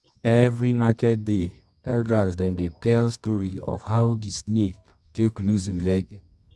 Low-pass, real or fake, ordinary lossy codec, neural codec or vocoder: none; fake; none; codec, 24 kHz, 0.9 kbps, WavTokenizer, medium music audio release